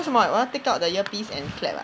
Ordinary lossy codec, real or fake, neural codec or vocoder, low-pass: none; real; none; none